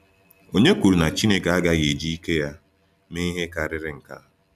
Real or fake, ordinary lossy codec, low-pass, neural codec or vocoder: real; none; 14.4 kHz; none